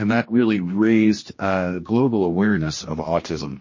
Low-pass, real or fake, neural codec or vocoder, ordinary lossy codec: 7.2 kHz; fake; codec, 16 kHz, 1 kbps, X-Codec, HuBERT features, trained on general audio; MP3, 32 kbps